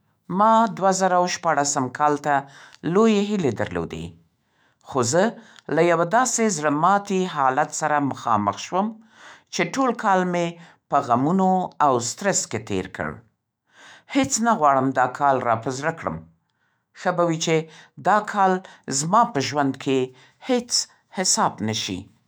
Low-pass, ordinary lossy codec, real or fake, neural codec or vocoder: none; none; fake; autoencoder, 48 kHz, 128 numbers a frame, DAC-VAE, trained on Japanese speech